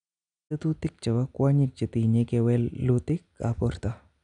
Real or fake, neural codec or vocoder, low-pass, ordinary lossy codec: real; none; 9.9 kHz; none